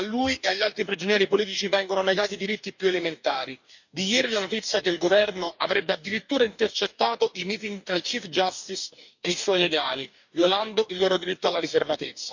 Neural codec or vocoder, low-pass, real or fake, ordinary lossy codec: codec, 44.1 kHz, 2.6 kbps, DAC; 7.2 kHz; fake; none